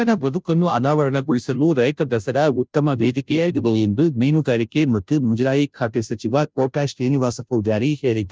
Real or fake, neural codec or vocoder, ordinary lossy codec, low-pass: fake; codec, 16 kHz, 0.5 kbps, FunCodec, trained on Chinese and English, 25 frames a second; none; none